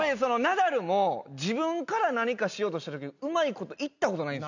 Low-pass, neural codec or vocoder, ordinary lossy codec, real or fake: 7.2 kHz; none; none; real